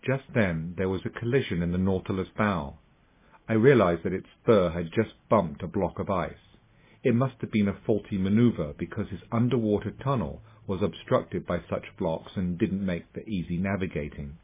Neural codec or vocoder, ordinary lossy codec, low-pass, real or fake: none; MP3, 16 kbps; 3.6 kHz; real